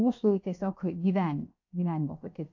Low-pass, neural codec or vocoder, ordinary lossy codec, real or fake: 7.2 kHz; codec, 16 kHz, 0.7 kbps, FocalCodec; AAC, 48 kbps; fake